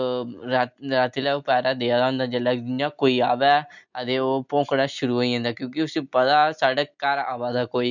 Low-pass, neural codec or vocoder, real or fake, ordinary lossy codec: 7.2 kHz; none; real; none